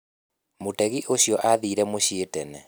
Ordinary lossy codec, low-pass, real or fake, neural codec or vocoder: none; none; real; none